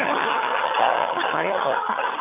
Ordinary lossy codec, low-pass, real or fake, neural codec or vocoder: MP3, 24 kbps; 3.6 kHz; fake; vocoder, 22.05 kHz, 80 mel bands, HiFi-GAN